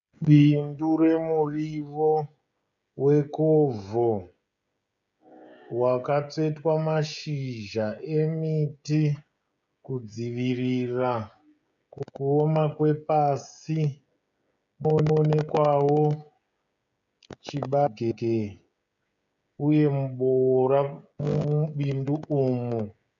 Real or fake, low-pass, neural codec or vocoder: fake; 7.2 kHz; codec, 16 kHz, 16 kbps, FreqCodec, smaller model